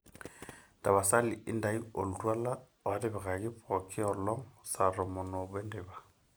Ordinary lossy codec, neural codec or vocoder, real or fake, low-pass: none; none; real; none